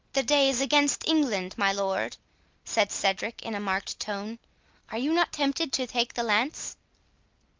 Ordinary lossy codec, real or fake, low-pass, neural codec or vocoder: Opus, 32 kbps; real; 7.2 kHz; none